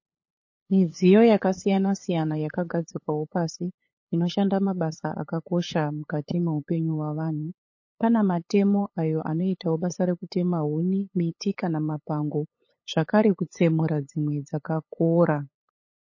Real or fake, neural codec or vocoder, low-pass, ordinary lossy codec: fake; codec, 16 kHz, 8 kbps, FunCodec, trained on LibriTTS, 25 frames a second; 7.2 kHz; MP3, 32 kbps